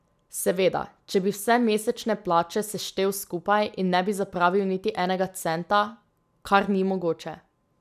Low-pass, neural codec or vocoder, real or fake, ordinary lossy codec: 14.4 kHz; none; real; none